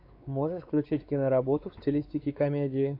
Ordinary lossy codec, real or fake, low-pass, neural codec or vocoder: AAC, 32 kbps; fake; 5.4 kHz; codec, 16 kHz, 4 kbps, X-Codec, WavLM features, trained on Multilingual LibriSpeech